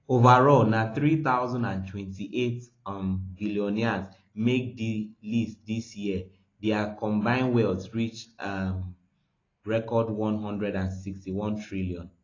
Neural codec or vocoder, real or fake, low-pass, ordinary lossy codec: none; real; 7.2 kHz; AAC, 32 kbps